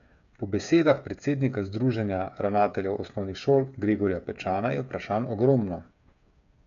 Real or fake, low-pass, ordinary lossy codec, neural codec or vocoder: fake; 7.2 kHz; none; codec, 16 kHz, 8 kbps, FreqCodec, smaller model